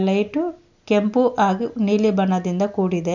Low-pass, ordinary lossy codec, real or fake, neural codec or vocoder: 7.2 kHz; none; real; none